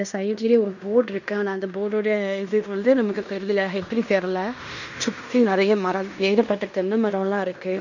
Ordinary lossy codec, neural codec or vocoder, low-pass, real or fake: none; codec, 16 kHz in and 24 kHz out, 0.9 kbps, LongCat-Audio-Codec, fine tuned four codebook decoder; 7.2 kHz; fake